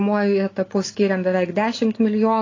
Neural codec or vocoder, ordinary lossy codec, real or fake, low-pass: none; AAC, 32 kbps; real; 7.2 kHz